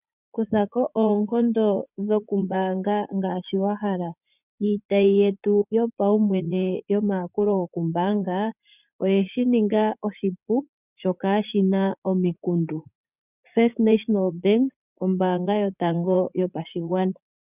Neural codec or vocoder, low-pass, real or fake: vocoder, 44.1 kHz, 80 mel bands, Vocos; 3.6 kHz; fake